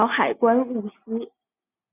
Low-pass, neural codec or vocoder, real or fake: 3.6 kHz; none; real